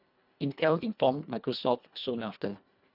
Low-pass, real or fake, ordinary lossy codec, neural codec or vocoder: 5.4 kHz; fake; none; codec, 24 kHz, 1.5 kbps, HILCodec